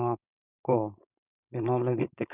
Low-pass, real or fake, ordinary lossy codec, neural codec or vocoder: 3.6 kHz; fake; none; codec, 16 kHz, 4.8 kbps, FACodec